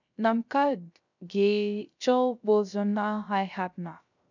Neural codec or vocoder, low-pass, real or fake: codec, 16 kHz, 0.3 kbps, FocalCodec; 7.2 kHz; fake